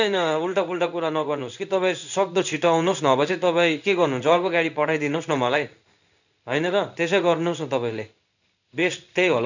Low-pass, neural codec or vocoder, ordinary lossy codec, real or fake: 7.2 kHz; codec, 16 kHz in and 24 kHz out, 1 kbps, XY-Tokenizer; none; fake